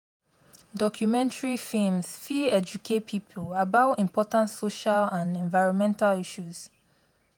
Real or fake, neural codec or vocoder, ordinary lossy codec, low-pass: fake; vocoder, 48 kHz, 128 mel bands, Vocos; none; none